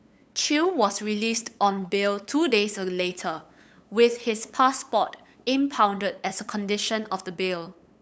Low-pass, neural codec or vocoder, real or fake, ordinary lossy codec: none; codec, 16 kHz, 8 kbps, FunCodec, trained on LibriTTS, 25 frames a second; fake; none